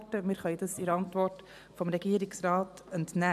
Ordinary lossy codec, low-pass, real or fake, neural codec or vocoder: none; 14.4 kHz; fake; vocoder, 44.1 kHz, 128 mel bands every 512 samples, BigVGAN v2